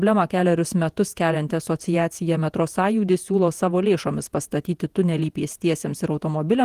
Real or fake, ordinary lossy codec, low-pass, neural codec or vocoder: fake; Opus, 24 kbps; 14.4 kHz; vocoder, 48 kHz, 128 mel bands, Vocos